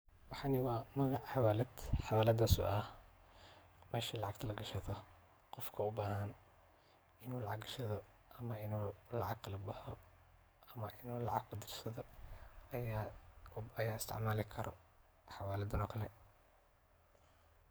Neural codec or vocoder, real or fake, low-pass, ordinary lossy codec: codec, 44.1 kHz, 7.8 kbps, DAC; fake; none; none